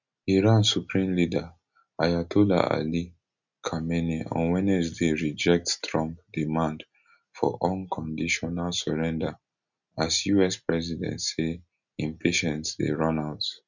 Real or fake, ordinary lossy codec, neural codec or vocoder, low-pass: real; none; none; 7.2 kHz